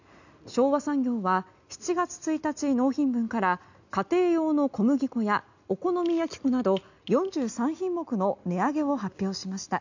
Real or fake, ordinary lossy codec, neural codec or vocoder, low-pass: real; none; none; 7.2 kHz